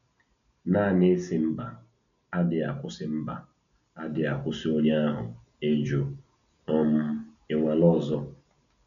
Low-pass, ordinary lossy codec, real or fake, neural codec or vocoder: 7.2 kHz; none; real; none